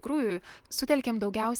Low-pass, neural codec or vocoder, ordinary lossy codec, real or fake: 19.8 kHz; vocoder, 44.1 kHz, 128 mel bands, Pupu-Vocoder; Opus, 32 kbps; fake